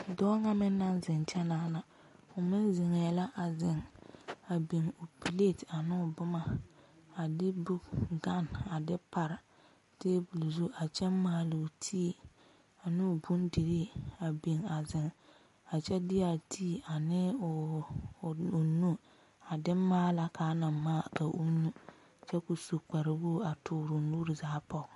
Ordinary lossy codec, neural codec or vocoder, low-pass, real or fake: MP3, 48 kbps; none; 14.4 kHz; real